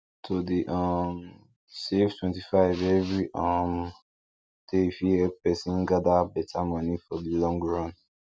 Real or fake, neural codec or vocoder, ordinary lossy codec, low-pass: real; none; none; none